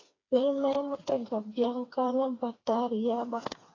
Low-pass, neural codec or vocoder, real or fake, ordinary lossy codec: 7.2 kHz; codec, 16 kHz, 4 kbps, FreqCodec, smaller model; fake; AAC, 32 kbps